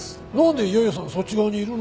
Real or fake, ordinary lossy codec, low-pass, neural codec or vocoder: real; none; none; none